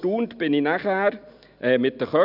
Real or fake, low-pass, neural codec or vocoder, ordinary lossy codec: real; 5.4 kHz; none; none